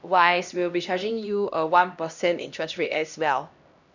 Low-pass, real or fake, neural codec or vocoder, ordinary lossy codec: 7.2 kHz; fake; codec, 16 kHz, 1 kbps, X-Codec, HuBERT features, trained on LibriSpeech; none